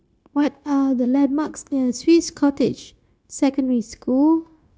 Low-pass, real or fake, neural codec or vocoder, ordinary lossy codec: none; fake; codec, 16 kHz, 0.9 kbps, LongCat-Audio-Codec; none